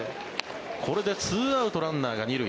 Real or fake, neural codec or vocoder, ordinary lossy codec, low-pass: real; none; none; none